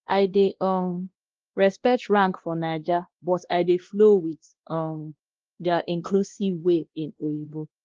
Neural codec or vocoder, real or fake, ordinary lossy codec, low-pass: codec, 16 kHz, 1 kbps, X-Codec, WavLM features, trained on Multilingual LibriSpeech; fake; Opus, 16 kbps; 7.2 kHz